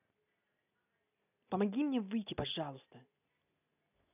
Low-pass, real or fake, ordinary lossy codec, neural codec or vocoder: 3.6 kHz; real; none; none